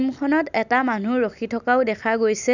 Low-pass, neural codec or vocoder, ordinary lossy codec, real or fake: 7.2 kHz; none; none; real